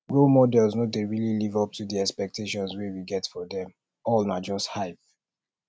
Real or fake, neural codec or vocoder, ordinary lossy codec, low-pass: real; none; none; none